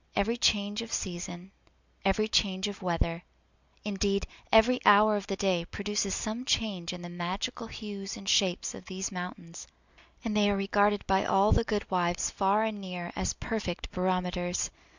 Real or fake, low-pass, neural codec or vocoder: real; 7.2 kHz; none